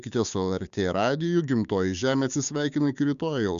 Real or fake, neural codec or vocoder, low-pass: fake; codec, 16 kHz, 6 kbps, DAC; 7.2 kHz